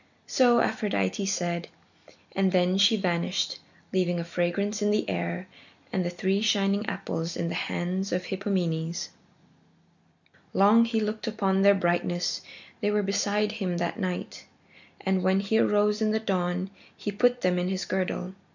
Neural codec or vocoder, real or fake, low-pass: none; real; 7.2 kHz